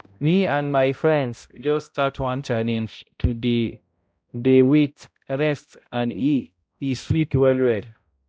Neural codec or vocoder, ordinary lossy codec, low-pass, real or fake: codec, 16 kHz, 0.5 kbps, X-Codec, HuBERT features, trained on balanced general audio; none; none; fake